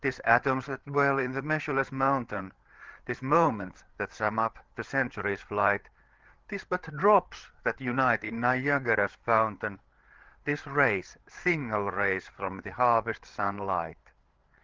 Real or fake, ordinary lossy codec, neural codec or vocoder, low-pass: fake; Opus, 16 kbps; codec, 16 kHz, 8 kbps, FreqCodec, larger model; 7.2 kHz